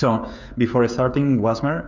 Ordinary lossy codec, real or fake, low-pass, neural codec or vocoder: MP3, 64 kbps; fake; 7.2 kHz; codec, 16 kHz, 16 kbps, FreqCodec, smaller model